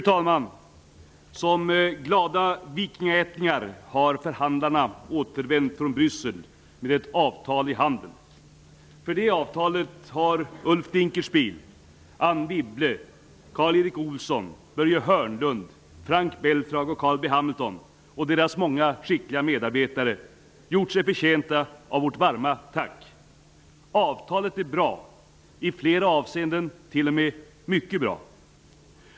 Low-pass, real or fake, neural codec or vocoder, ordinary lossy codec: none; real; none; none